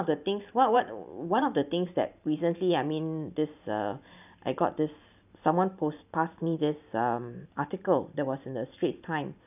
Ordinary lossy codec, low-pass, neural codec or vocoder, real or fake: none; 3.6 kHz; vocoder, 44.1 kHz, 128 mel bands every 512 samples, BigVGAN v2; fake